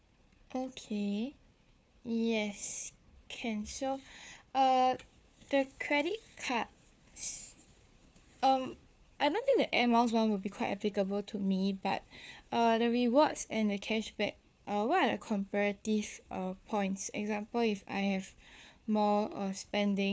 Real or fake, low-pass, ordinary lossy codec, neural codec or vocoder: fake; none; none; codec, 16 kHz, 4 kbps, FunCodec, trained on Chinese and English, 50 frames a second